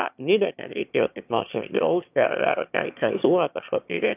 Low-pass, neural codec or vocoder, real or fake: 3.6 kHz; autoencoder, 22.05 kHz, a latent of 192 numbers a frame, VITS, trained on one speaker; fake